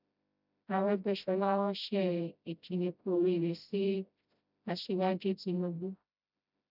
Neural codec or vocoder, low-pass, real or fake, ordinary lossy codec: codec, 16 kHz, 0.5 kbps, FreqCodec, smaller model; 5.4 kHz; fake; none